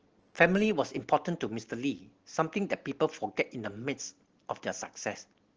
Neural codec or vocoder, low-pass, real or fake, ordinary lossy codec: none; 7.2 kHz; real; Opus, 16 kbps